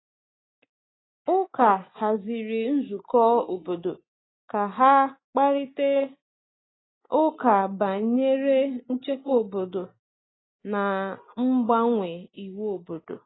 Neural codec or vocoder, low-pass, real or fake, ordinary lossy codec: none; 7.2 kHz; real; AAC, 16 kbps